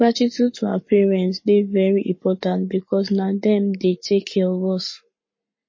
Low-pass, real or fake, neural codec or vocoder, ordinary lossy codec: 7.2 kHz; fake; codec, 44.1 kHz, 7.8 kbps, Pupu-Codec; MP3, 32 kbps